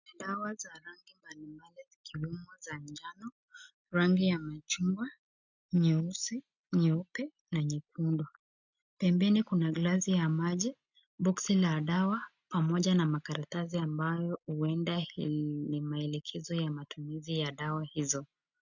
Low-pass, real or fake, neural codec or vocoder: 7.2 kHz; real; none